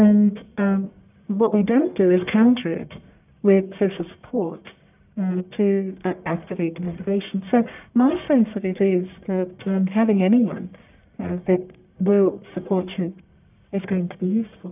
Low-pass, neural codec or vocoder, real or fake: 3.6 kHz; codec, 44.1 kHz, 1.7 kbps, Pupu-Codec; fake